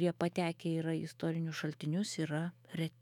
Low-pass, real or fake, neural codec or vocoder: 19.8 kHz; fake; autoencoder, 48 kHz, 128 numbers a frame, DAC-VAE, trained on Japanese speech